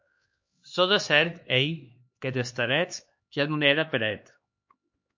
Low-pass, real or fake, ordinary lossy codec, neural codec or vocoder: 7.2 kHz; fake; MP3, 48 kbps; codec, 16 kHz, 2 kbps, X-Codec, HuBERT features, trained on LibriSpeech